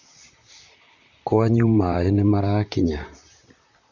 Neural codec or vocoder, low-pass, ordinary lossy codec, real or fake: vocoder, 44.1 kHz, 128 mel bands, Pupu-Vocoder; 7.2 kHz; none; fake